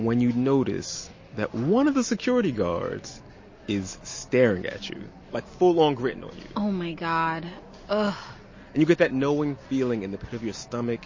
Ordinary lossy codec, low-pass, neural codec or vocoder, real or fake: MP3, 32 kbps; 7.2 kHz; none; real